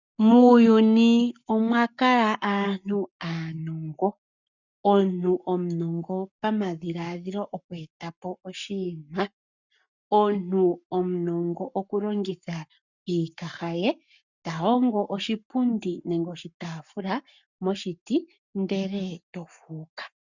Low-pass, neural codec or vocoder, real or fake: 7.2 kHz; codec, 44.1 kHz, 7.8 kbps, Pupu-Codec; fake